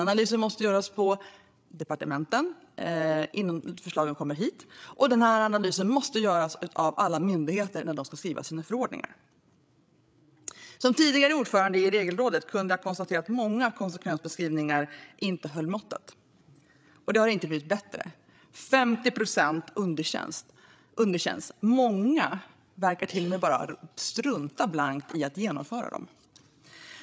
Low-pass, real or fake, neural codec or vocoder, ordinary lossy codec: none; fake; codec, 16 kHz, 8 kbps, FreqCodec, larger model; none